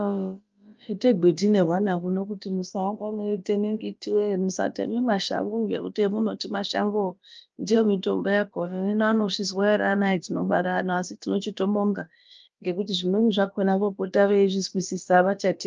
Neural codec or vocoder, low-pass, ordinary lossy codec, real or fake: codec, 16 kHz, about 1 kbps, DyCAST, with the encoder's durations; 7.2 kHz; Opus, 24 kbps; fake